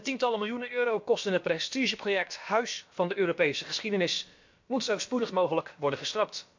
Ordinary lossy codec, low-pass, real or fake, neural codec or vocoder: MP3, 48 kbps; 7.2 kHz; fake; codec, 16 kHz, about 1 kbps, DyCAST, with the encoder's durations